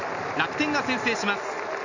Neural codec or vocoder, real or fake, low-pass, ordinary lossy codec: none; real; 7.2 kHz; none